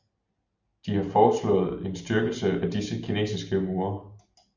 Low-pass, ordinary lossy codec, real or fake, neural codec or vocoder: 7.2 kHz; Opus, 64 kbps; real; none